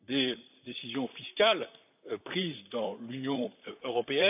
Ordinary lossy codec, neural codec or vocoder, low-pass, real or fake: AAC, 32 kbps; vocoder, 44.1 kHz, 128 mel bands, Pupu-Vocoder; 3.6 kHz; fake